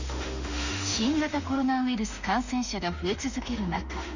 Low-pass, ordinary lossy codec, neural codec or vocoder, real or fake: 7.2 kHz; none; autoencoder, 48 kHz, 32 numbers a frame, DAC-VAE, trained on Japanese speech; fake